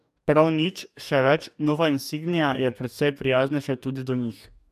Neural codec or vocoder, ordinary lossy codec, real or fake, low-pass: codec, 44.1 kHz, 2.6 kbps, DAC; none; fake; 14.4 kHz